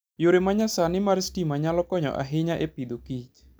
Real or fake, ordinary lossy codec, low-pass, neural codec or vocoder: real; none; none; none